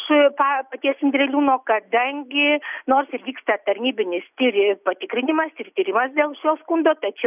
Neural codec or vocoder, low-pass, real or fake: none; 3.6 kHz; real